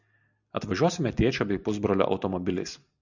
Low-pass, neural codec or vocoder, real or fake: 7.2 kHz; none; real